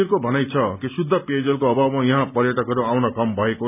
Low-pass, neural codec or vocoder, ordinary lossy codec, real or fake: 3.6 kHz; none; none; real